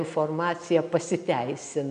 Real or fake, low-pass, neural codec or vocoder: real; 9.9 kHz; none